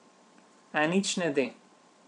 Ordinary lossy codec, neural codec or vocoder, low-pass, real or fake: none; vocoder, 22.05 kHz, 80 mel bands, Vocos; 9.9 kHz; fake